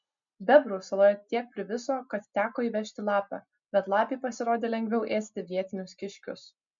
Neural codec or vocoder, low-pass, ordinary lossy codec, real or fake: none; 7.2 kHz; MP3, 64 kbps; real